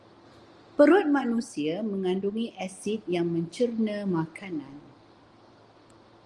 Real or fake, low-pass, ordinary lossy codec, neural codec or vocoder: real; 10.8 kHz; Opus, 24 kbps; none